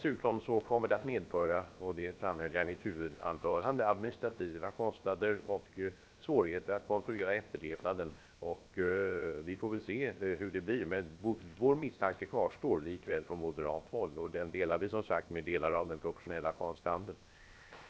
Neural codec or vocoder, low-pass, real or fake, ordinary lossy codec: codec, 16 kHz, 0.7 kbps, FocalCodec; none; fake; none